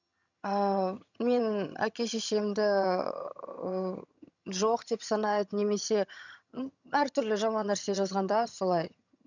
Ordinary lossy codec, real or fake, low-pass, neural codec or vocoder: none; fake; 7.2 kHz; vocoder, 22.05 kHz, 80 mel bands, HiFi-GAN